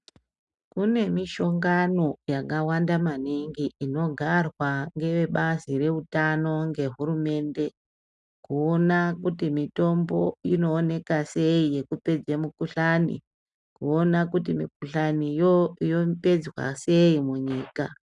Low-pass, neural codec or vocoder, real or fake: 10.8 kHz; none; real